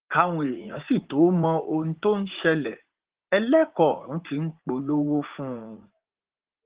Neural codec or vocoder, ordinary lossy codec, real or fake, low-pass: vocoder, 44.1 kHz, 80 mel bands, Vocos; Opus, 16 kbps; fake; 3.6 kHz